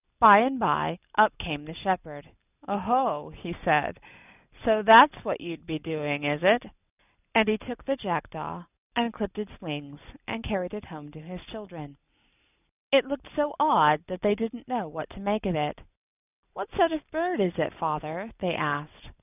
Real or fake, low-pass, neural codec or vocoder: real; 3.6 kHz; none